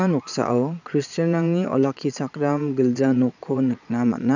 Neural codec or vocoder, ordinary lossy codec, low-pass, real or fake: vocoder, 22.05 kHz, 80 mel bands, Vocos; none; 7.2 kHz; fake